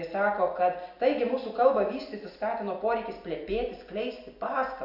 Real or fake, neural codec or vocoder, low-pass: real; none; 5.4 kHz